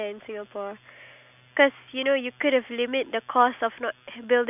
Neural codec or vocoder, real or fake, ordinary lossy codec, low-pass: none; real; none; 3.6 kHz